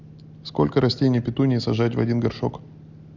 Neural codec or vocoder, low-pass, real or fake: none; 7.2 kHz; real